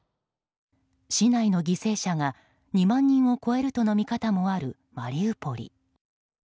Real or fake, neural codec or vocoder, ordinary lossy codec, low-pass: real; none; none; none